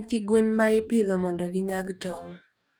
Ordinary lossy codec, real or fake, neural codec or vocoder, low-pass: none; fake; codec, 44.1 kHz, 2.6 kbps, DAC; none